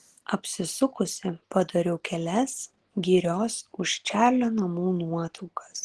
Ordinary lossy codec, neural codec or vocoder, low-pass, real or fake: Opus, 16 kbps; none; 10.8 kHz; real